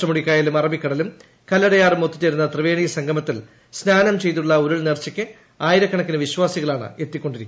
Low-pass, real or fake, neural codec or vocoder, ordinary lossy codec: none; real; none; none